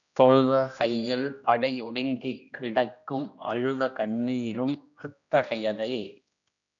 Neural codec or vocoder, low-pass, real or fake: codec, 16 kHz, 1 kbps, X-Codec, HuBERT features, trained on general audio; 7.2 kHz; fake